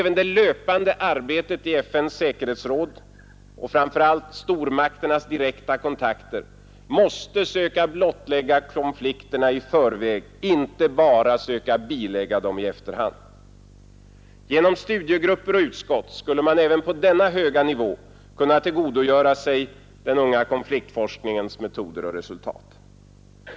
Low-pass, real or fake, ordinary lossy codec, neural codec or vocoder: none; real; none; none